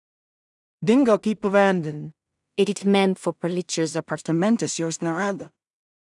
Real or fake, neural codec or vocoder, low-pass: fake; codec, 16 kHz in and 24 kHz out, 0.4 kbps, LongCat-Audio-Codec, two codebook decoder; 10.8 kHz